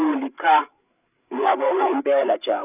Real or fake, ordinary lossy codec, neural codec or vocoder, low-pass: fake; none; codec, 16 kHz, 8 kbps, FreqCodec, larger model; 3.6 kHz